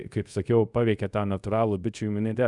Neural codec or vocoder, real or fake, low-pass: codec, 24 kHz, 0.5 kbps, DualCodec; fake; 10.8 kHz